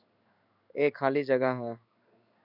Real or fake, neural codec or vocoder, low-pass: fake; codec, 16 kHz in and 24 kHz out, 1 kbps, XY-Tokenizer; 5.4 kHz